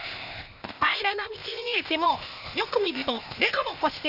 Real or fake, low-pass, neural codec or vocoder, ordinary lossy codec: fake; 5.4 kHz; codec, 16 kHz, 0.8 kbps, ZipCodec; none